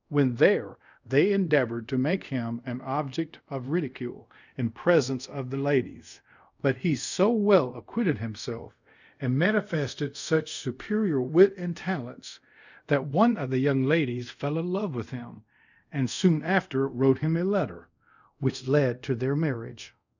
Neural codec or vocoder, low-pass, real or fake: codec, 24 kHz, 0.5 kbps, DualCodec; 7.2 kHz; fake